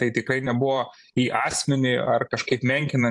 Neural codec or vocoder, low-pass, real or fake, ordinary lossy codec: vocoder, 44.1 kHz, 128 mel bands every 512 samples, BigVGAN v2; 10.8 kHz; fake; AAC, 64 kbps